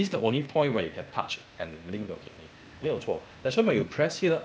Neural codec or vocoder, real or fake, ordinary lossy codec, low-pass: codec, 16 kHz, 0.8 kbps, ZipCodec; fake; none; none